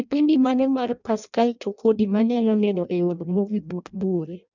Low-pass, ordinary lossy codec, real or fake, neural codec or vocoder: 7.2 kHz; none; fake; codec, 16 kHz in and 24 kHz out, 0.6 kbps, FireRedTTS-2 codec